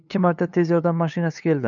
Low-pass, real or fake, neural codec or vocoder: 7.2 kHz; fake; codec, 16 kHz, 8 kbps, FunCodec, trained on LibriTTS, 25 frames a second